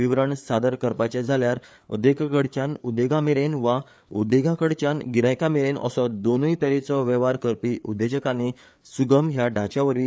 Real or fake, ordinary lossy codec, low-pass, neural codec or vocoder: fake; none; none; codec, 16 kHz, 4 kbps, FreqCodec, larger model